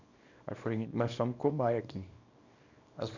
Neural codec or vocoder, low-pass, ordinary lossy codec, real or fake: codec, 24 kHz, 0.9 kbps, WavTokenizer, small release; 7.2 kHz; none; fake